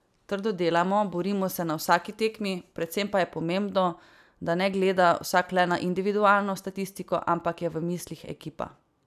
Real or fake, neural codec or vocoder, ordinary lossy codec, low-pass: real; none; none; 14.4 kHz